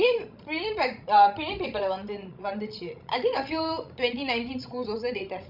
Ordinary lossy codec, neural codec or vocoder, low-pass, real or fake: none; codec, 16 kHz, 16 kbps, FreqCodec, larger model; 5.4 kHz; fake